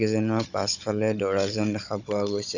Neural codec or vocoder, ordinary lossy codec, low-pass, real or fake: codec, 16 kHz, 16 kbps, FunCodec, trained on Chinese and English, 50 frames a second; none; 7.2 kHz; fake